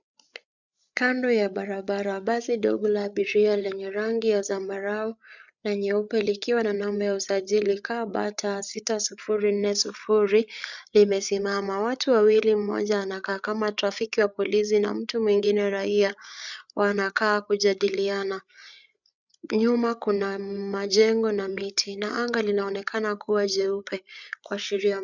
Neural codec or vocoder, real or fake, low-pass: codec, 16 kHz, 8 kbps, FreqCodec, larger model; fake; 7.2 kHz